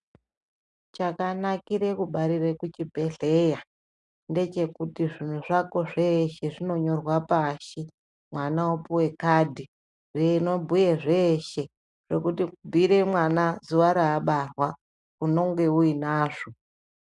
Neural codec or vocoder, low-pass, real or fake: none; 10.8 kHz; real